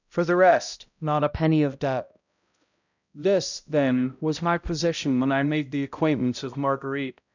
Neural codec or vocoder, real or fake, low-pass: codec, 16 kHz, 0.5 kbps, X-Codec, HuBERT features, trained on balanced general audio; fake; 7.2 kHz